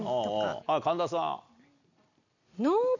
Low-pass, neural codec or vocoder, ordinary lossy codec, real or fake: 7.2 kHz; none; none; real